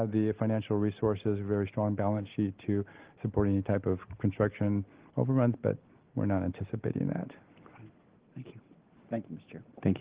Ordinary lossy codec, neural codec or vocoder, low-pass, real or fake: Opus, 16 kbps; none; 3.6 kHz; real